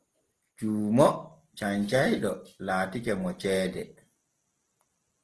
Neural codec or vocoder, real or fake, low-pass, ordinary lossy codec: none; real; 10.8 kHz; Opus, 16 kbps